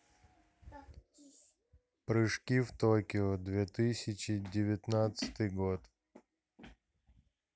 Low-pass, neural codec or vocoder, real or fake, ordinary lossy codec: none; none; real; none